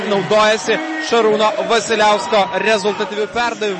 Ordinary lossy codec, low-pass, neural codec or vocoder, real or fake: MP3, 32 kbps; 10.8 kHz; none; real